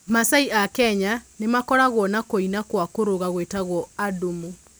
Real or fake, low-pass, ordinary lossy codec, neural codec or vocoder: real; none; none; none